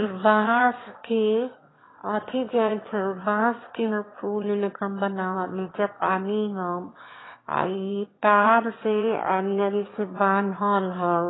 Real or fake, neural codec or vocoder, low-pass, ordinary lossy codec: fake; autoencoder, 22.05 kHz, a latent of 192 numbers a frame, VITS, trained on one speaker; 7.2 kHz; AAC, 16 kbps